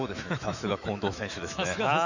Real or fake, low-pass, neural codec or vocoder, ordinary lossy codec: fake; 7.2 kHz; autoencoder, 48 kHz, 128 numbers a frame, DAC-VAE, trained on Japanese speech; none